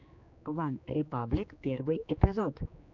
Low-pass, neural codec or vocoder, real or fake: 7.2 kHz; codec, 16 kHz, 2 kbps, X-Codec, HuBERT features, trained on general audio; fake